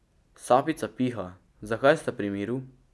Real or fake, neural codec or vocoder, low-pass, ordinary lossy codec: real; none; none; none